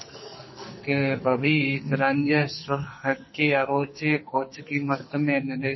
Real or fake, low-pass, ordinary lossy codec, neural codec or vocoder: fake; 7.2 kHz; MP3, 24 kbps; codec, 44.1 kHz, 2.6 kbps, SNAC